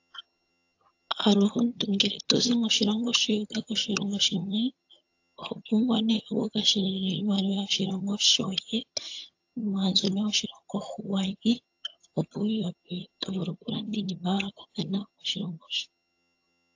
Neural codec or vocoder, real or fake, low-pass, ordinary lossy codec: vocoder, 22.05 kHz, 80 mel bands, HiFi-GAN; fake; 7.2 kHz; AAC, 48 kbps